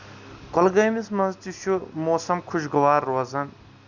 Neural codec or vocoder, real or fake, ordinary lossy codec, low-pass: none; real; Opus, 64 kbps; 7.2 kHz